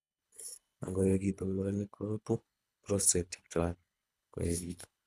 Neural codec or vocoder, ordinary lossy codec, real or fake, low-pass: codec, 24 kHz, 3 kbps, HILCodec; none; fake; none